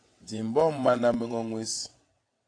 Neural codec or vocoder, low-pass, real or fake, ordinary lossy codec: vocoder, 22.05 kHz, 80 mel bands, WaveNeXt; 9.9 kHz; fake; AAC, 48 kbps